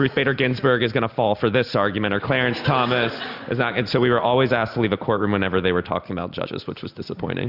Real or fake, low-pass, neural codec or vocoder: real; 5.4 kHz; none